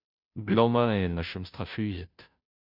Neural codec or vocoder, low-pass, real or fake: codec, 16 kHz, 0.5 kbps, FunCodec, trained on Chinese and English, 25 frames a second; 5.4 kHz; fake